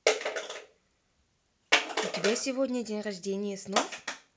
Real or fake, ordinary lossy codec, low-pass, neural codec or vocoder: real; none; none; none